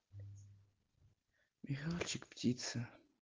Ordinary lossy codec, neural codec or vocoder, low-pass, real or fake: Opus, 16 kbps; none; 7.2 kHz; real